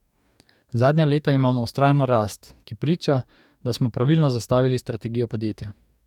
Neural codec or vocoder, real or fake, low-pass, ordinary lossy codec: codec, 44.1 kHz, 2.6 kbps, DAC; fake; 19.8 kHz; none